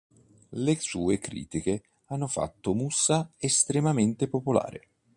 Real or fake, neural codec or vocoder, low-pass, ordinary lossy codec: real; none; 10.8 kHz; MP3, 96 kbps